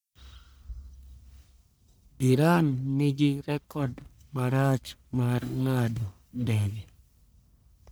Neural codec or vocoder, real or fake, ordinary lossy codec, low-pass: codec, 44.1 kHz, 1.7 kbps, Pupu-Codec; fake; none; none